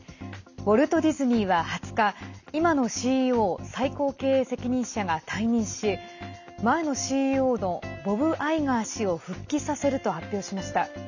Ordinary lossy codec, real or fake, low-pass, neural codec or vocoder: none; real; 7.2 kHz; none